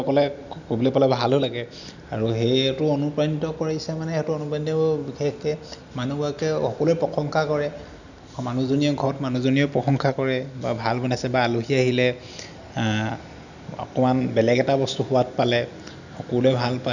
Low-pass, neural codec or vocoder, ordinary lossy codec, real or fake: 7.2 kHz; none; none; real